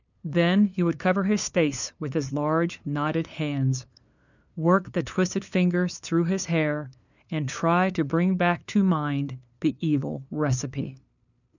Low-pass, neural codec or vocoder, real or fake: 7.2 kHz; codec, 16 kHz, 2 kbps, FunCodec, trained on LibriTTS, 25 frames a second; fake